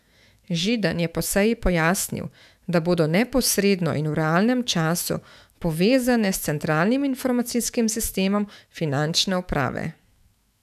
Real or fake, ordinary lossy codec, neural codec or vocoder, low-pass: fake; none; autoencoder, 48 kHz, 128 numbers a frame, DAC-VAE, trained on Japanese speech; 14.4 kHz